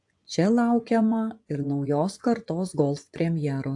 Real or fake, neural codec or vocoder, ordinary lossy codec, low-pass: fake; vocoder, 24 kHz, 100 mel bands, Vocos; AAC, 64 kbps; 10.8 kHz